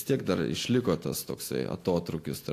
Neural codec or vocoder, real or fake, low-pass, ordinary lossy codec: none; real; 14.4 kHz; AAC, 64 kbps